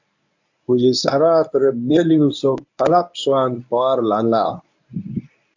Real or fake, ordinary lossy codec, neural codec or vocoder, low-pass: fake; AAC, 48 kbps; codec, 24 kHz, 0.9 kbps, WavTokenizer, medium speech release version 1; 7.2 kHz